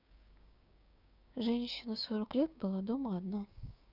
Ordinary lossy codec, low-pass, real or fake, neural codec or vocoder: none; 5.4 kHz; fake; codec, 16 kHz, 6 kbps, DAC